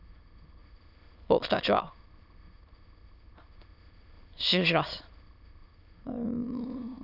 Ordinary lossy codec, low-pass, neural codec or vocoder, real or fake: none; 5.4 kHz; autoencoder, 22.05 kHz, a latent of 192 numbers a frame, VITS, trained on many speakers; fake